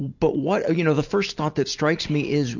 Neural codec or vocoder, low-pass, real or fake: none; 7.2 kHz; real